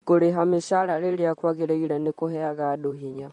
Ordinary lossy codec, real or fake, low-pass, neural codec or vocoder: MP3, 48 kbps; fake; 19.8 kHz; vocoder, 44.1 kHz, 128 mel bands, Pupu-Vocoder